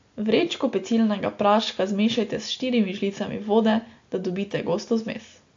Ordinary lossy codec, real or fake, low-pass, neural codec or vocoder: AAC, 48 kbps; real; 7.2 kHz; none